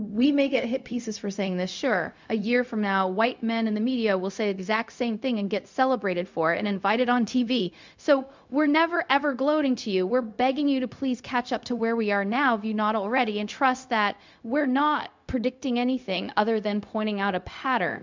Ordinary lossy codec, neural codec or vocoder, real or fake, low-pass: MP3, 64 kbps; codec, 16 kHz, 0.4 kbps, LongCat-Audio-Codec; fake; 7.2 kHz